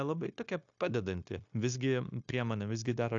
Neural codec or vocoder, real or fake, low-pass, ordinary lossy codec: codec, 16 kHz, 0.9 kbps, LongCat-Audio-Codec; fake; 7.2 kHz; AAC, 64 kbps